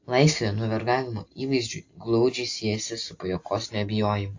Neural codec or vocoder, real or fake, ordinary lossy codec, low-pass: none; real; AAC, 48 kbps; 7.2 kHz